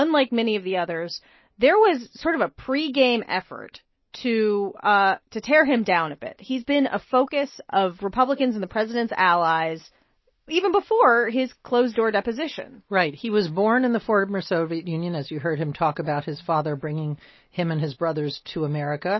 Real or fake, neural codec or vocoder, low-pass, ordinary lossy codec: real; none; 7.2 kHz; MP3, 24 kbps